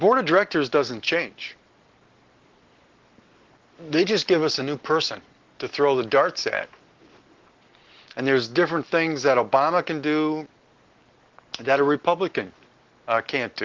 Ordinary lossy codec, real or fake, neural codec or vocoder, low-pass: Opus, 16 kbps; real; none; 7.2 kHz